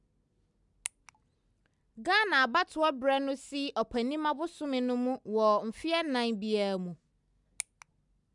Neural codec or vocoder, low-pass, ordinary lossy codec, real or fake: none; 10.8 kHz; none; real